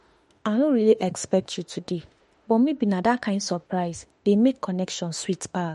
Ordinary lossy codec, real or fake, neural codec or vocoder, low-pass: MP3, 48 kbps; fake; autoencoder, 48 kHz, 32 numbers a frame, DAC-VAE, trained on Japanese speech; 19.8 kHz